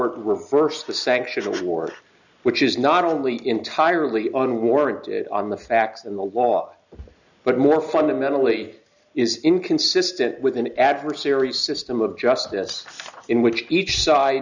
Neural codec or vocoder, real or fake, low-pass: none; real; 7.2 kHz